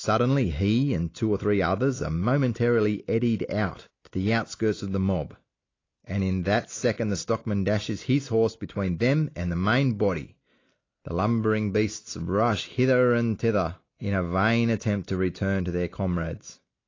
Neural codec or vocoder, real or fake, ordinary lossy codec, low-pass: none; real; AAC, 32 kbps; 7.2 kHz